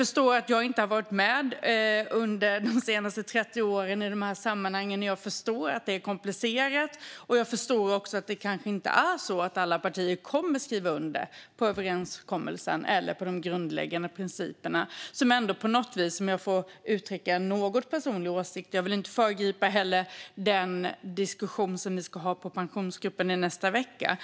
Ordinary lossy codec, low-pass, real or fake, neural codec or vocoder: none; none; real; none